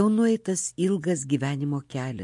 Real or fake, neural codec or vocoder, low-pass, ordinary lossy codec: real; none; 10.8 kHz; MP3, 48 kbps